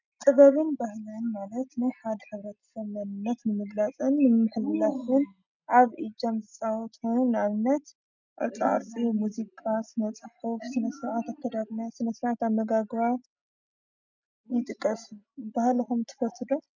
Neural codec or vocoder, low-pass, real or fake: none; 7.2 kHz; real